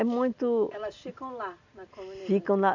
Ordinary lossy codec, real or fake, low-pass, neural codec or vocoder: none; real; 7.2 kHz; none